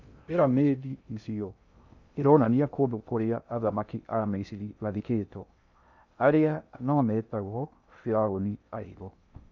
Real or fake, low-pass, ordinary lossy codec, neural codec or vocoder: fake; 7.2 kHz; none; codec, 16 kHz in and 24 kHz out, 0.6 kbps, FocalCodec, streaming, 2048 codes